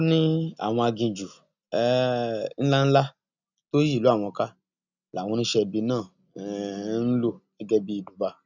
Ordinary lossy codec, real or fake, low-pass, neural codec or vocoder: none; real; 7.2 kHz; none